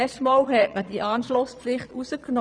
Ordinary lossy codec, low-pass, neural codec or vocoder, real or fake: none; 9.9 kHz; vocoder, 22.05 kHz, 80 mel bands, Vocos; fake